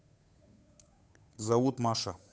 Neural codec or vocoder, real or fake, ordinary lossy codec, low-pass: codec, 16 kHz, 8 kbps, FunCodec, trained on Chinese and English, 25 frames a second; fake; none; none